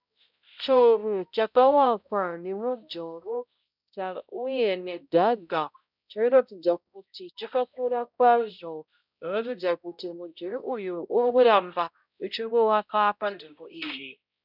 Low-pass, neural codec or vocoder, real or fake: 5.4 kHz; codec, 16 kHz, 0.5 kbps, X-Codec, HuBERT features, trained on balanced general audio; fake